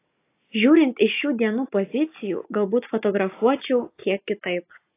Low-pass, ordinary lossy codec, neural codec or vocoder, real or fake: 3.6 kHz; AAC, 24 kbps; none; real